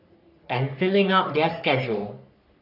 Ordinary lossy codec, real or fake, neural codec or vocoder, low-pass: none; fake; codec, 44.1 kHz, 3.4 kbps, Pupu-Codec; 5.4 kHz